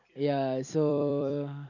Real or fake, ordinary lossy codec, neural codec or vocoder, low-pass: fake; none; vocoder, 44.1 kHz, 128 mel bands every 256 samples, BigVGAN v2; 7.2 kHz